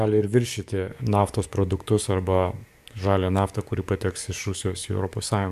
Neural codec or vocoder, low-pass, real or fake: codec, 44.1 kHz, 7.8 kbps, DAC; 14.4 kHz; fake